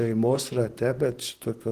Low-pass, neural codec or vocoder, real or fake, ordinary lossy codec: 14.4 kHz; vocoder, 44.1 kHz, 128 mel bands, Pupu-Vocoder; fake; Opus, 16 kbps